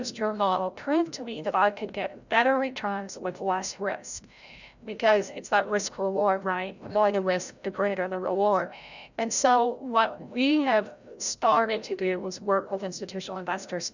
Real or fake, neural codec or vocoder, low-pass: fake; codec, 16 kHz, 0.5 kbps, FreqCodec, larger model; 7.2 kHz